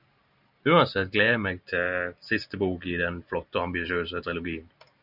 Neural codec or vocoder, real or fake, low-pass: none; real; 5.4 kHz